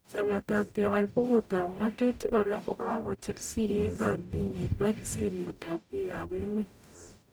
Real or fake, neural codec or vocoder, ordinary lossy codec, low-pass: fake; codec, 44.1 kHz, 0.9 kbps, DAC; none; none